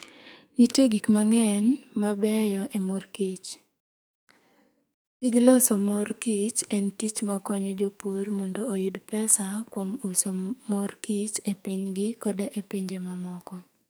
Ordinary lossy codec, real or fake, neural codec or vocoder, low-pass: none; fake; codec, 44.1 kHz, 2.6 kbps, SNAC; none